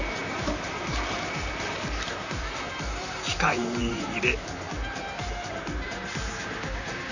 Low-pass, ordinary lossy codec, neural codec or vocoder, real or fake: 7.2 kHz; none; vocoder, 44.1 kHz, 128 mel bands, Pupu-Vocoder; fake